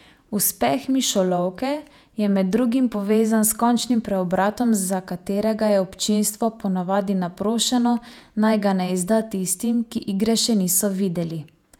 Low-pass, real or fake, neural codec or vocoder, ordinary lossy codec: 19.8 kHz; fake; vocoder, 48 kHz, 128 mel bands, Vocos; none